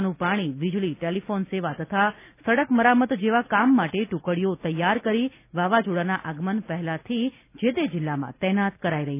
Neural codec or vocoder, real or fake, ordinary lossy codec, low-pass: none; real; none; 3.6 kHz